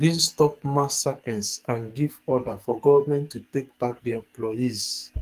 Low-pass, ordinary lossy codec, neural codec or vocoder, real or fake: 14.4 kHz; Opus, 32 kbps; codec, 44.1 kHz, 2.6 kbps, SNAC; fake